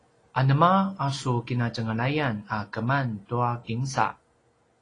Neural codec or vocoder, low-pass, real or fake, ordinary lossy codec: none; 9.9 kHz; real; AAC, 32 kbps